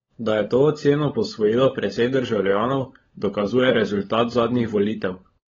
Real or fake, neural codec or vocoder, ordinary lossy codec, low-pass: fake; codec, 16 kHz, 16 kbps, FunCodec, trained on LibriTTS, 50 frames a second; AAC, 24 kbps; 7.2 kHz